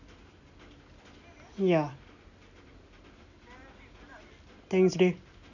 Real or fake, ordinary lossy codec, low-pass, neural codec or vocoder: real; none; 7.2 kHz; none